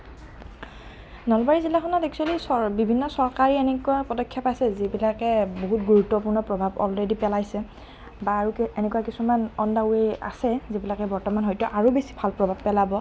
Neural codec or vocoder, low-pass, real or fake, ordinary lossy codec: none; none; real; none